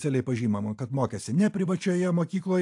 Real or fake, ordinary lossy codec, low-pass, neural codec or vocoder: fake; AAC, 64 kbps; 10.8 kHz; vocoder, 44.1 kHz, 128 mel bands every 512 samples, BigVGAN v2